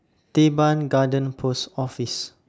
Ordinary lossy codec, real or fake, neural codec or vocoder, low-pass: none; real; none; none